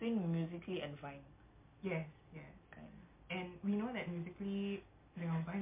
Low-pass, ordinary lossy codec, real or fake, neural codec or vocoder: 3.6 kHz; MP3, 32 kbps; real; none